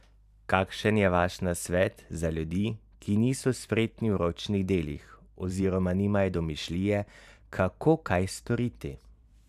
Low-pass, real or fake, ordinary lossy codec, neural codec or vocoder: 14.4 kHz; fake; none; vocoder, 44.1 kHz, 128 mel bands every 512 samples, BigVGAN v2